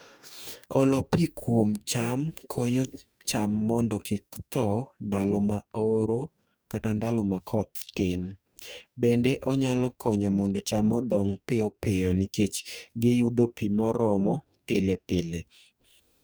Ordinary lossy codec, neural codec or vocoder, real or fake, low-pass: none; codec, 44.1 kHz, 2.6 kbps, DAC; fake; none